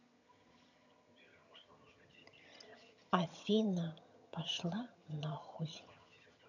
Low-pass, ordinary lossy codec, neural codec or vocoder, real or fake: 7.2 kHz; none; vocoder, 22.05 kHz, 80 mel bands, HiFi-GAN; fake